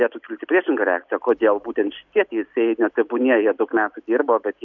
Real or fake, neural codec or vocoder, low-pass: real; none; 7.2 kHz